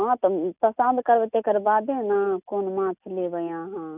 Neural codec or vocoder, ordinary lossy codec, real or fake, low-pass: none; none; real; 3.6 kHz